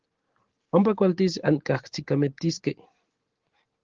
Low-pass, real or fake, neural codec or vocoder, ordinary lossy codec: 7.2 kHz; real; none; Opus, 16 kbps